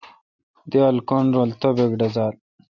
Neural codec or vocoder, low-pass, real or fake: none; 7.2 kHz; real